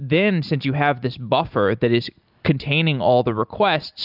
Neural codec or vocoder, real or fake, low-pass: none; real; 5.4 kHz